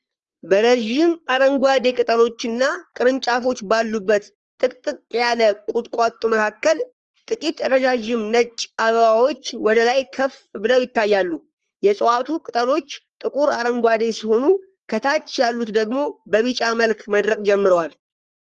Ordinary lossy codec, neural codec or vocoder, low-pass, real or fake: Opus, 24 kbps; codec, 44.1 kHz, 3.4 kbps, Pupu-Codec; 10.8 kHz; fake